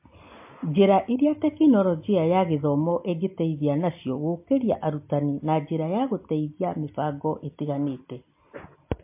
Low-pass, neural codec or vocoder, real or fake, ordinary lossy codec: 3.6 kHz; none; real; MP3, 24 kbps